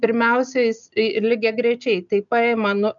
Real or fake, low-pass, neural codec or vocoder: real; 7.2 kHz; none